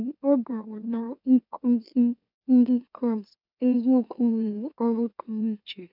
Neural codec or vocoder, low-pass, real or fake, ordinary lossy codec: autoencoder, 44.1 kHz, a latent of 192 numbers a frame, MeloTTS; 5.4 kHz; fake; none